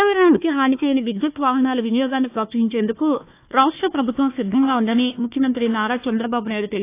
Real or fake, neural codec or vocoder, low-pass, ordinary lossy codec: fake; codec, 16 kHz, 1 kbps, FunCodec, trained on Chinese and English, 50 frames a second; 3.6 kHz; AAC, 24 kbps